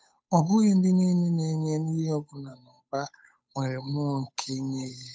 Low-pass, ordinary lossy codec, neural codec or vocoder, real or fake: none; none; codec, 16 kHz, 8 kbps, FunCodec, trained on Chinese and English, 25 frames a second; fake